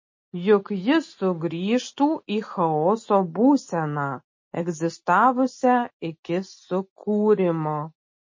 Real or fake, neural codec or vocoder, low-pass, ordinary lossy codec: real; none; 7.2 kHz; MP3, 32 kbps